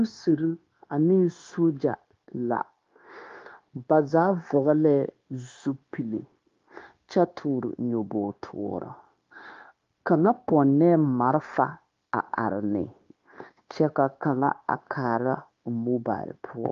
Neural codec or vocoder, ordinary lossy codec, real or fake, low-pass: codec, 16 kHz, 0.9 kbps, LongCat-Audio-Codec; Opus, 32 kbps; fake; 7.2 kHz